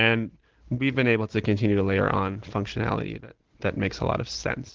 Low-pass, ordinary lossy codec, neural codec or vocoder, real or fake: 7.2 kHz; Opus, 16 kbps; none; real